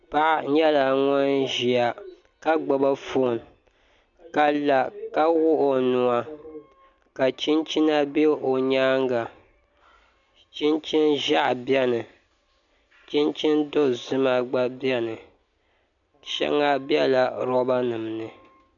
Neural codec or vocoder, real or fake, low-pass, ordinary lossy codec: none; real; 7.2 kHz; MP3, 96 kbps